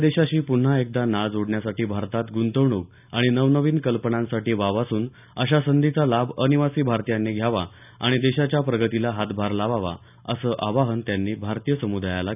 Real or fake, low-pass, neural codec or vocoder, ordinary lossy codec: real; 3.6 kHz; none; none